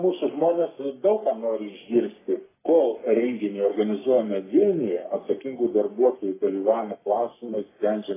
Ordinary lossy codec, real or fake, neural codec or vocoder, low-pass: AAC, 16 kbps; fake; codec, 44.1 kHz, 3.4 kbps, Pupu-Codec; 3.6 kHz